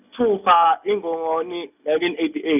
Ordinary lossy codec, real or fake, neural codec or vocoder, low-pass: none; real; none; 3.6 kHz